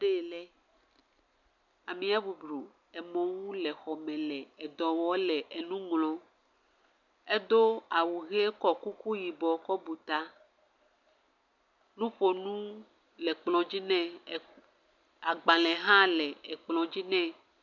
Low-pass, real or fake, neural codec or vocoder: 7.2 kHz; real; none